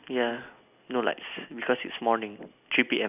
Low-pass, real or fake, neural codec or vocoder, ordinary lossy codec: 3.6 kHz; real; none; none